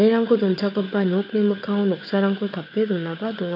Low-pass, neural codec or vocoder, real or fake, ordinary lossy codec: 5.4 kHz; codec, 16 kHz, 4 kbps, FunCodec, trained on Chinese and English, 50 frames a second; fake; AAC, 32 kbps